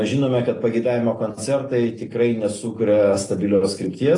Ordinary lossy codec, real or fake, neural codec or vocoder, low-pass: AAC, 32 kbps; real; none; 10.8 kHz